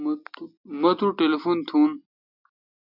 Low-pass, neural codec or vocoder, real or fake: 5.4 kHz; none; real